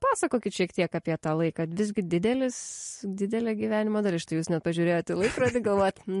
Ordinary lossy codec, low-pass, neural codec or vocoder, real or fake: MP3, 48 kbps; 14.4 kHz; none; real